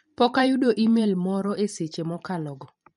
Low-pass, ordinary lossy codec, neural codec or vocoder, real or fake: 19.8 kHz; MP3, 48 kbps; vocoder, 44.1 kHz, 128 mel bands every 512 samples, BigVGAN v2; fake